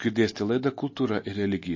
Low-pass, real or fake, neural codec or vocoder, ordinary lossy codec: 7.2 kHz; real; none; MP3, 32 kbps